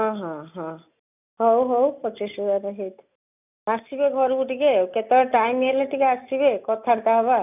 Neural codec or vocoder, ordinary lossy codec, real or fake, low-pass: none; none; real; 3.6 kHz